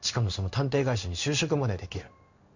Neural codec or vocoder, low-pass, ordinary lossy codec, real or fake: codec, 16 kHz in and 24 kHz out, 1 kbps, XY-Tokenizer; 7.2 kHz; none; fake